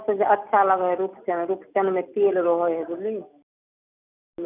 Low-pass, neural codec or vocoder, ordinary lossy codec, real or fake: 3.6 kHz; none; none; real